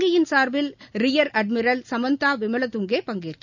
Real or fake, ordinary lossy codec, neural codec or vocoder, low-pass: real; none; none; 7.2 kHz